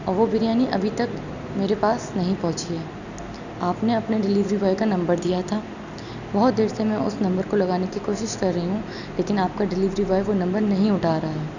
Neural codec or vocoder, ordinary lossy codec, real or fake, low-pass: none; none; real; 7.2 kHz